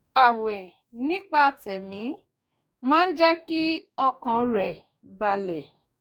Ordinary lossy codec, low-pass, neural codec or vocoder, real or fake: none; 19.8 kHz; codec, 44.1 kHz, 2.6 kbps, DAC; fake